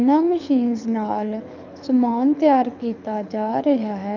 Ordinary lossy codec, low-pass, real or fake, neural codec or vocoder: none; 7.2 kHz; fake; codec, 24 kHz, 6 kbps, HILCodec